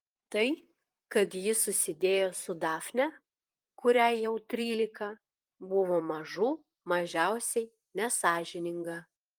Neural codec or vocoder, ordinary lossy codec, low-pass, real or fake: vocoder, 44.1 kHz, 128 mel bands, Pupu-Vocoder; Opus, 32 kbps; 19.8 kHz; fake